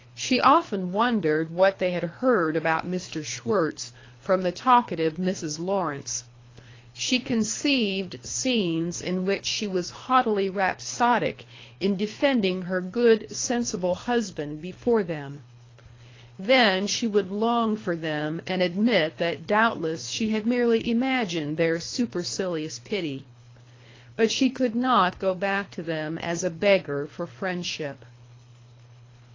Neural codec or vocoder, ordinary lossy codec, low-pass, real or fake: codec, 24 kHz, 3 kbps, HILCodec; AAC, 32 kbps; 7.2 kHz; fake